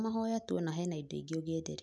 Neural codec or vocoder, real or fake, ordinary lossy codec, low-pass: none; real; none; none